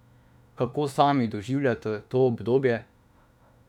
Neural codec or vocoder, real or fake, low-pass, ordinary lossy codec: autoencoder, 48 kHz, 32 numbers a frame, DAC-VAE, trained on Japanese speech; fake; 19.8 kHz; none